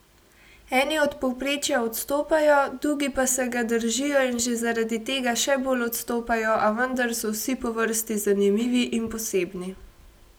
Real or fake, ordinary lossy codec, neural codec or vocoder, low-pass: real; none; none; none